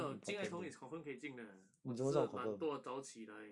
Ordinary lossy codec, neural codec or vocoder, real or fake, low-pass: none; none; real; 10.8 kHz